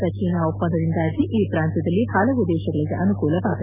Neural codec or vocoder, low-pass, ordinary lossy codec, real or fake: none; 3.6 kHz; none; real